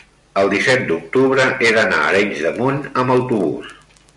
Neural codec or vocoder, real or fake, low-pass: none; real; 10.8 kHz